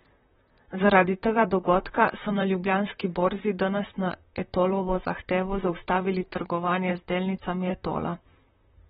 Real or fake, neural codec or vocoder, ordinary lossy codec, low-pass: fake; vocoder, 44.1 kHz, 128 mel bands, Pupu-Vocoder; AAC, 16 kbps; 19.8 kHz